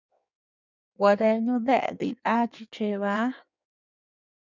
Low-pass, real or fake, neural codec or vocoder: 7.2 kHz; fake; codec, 16 kHz in and 24 kHz out, 1.1 kbps, FireRedTTS-2 codec